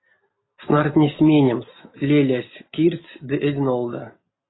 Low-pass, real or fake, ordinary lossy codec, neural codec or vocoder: 7.2 kHz; real; AAC, 16 kbps; none